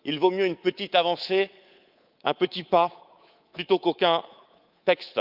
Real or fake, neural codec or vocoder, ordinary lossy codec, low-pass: fake; codec, 24 kHz, 3.1 kbps, DualCodec; Opus, 24 kbps; 5.4 kHz